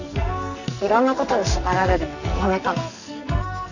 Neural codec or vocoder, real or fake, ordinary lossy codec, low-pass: codec, 44.1 kHz, 2.6 kbps, SNAC; fake; none; 7.2 kHz